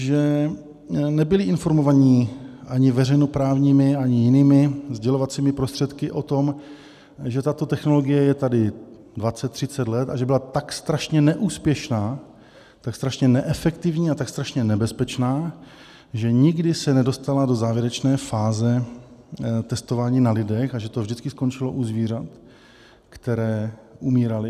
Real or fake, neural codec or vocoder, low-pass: real; none; 14.4 kHz